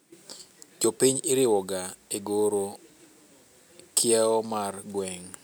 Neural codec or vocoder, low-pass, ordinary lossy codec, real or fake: none; none; none; real